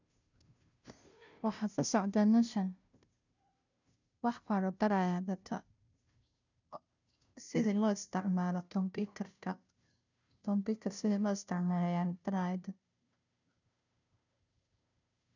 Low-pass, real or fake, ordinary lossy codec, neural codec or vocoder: 7.2 kHz; fake; none; codec, 16 kHz, 0.5 kbps, FunCodec, trained on Chinese and English, 25 frames a second